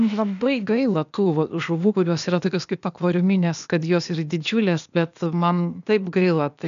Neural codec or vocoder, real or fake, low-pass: codec, 16 kHz, 0.8 kbps, ZipCodec; fake; 7.2 kHz